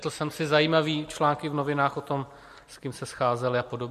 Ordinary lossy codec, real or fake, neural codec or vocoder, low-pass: MP3, 64 kbps; real; none; 14.4 kHz